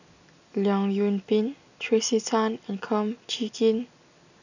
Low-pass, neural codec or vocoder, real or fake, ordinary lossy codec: 7.2 kHz; none; real; none